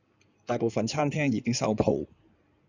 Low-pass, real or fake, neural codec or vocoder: 7.2 kHz; fake; codec, 16 kHz in and 24 kHz out, 2.2 kbps, FireRedTTS-2 codec